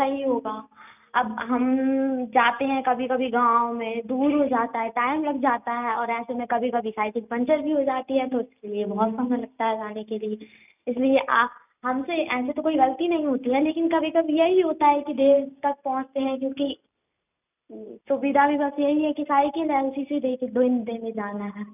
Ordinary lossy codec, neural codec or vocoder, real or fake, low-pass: none; none; real; 3.6 kHz